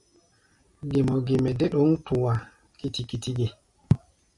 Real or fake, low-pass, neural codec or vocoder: real; 10.8 kHz; none